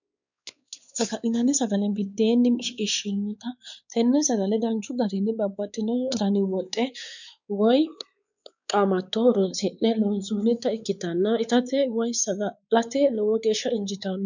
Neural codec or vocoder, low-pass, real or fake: codec, 16 kHz, 4 kbps, X-Codec, WavLM features, trained on Multilingual LibriSpeech; 7.2 kHz; fake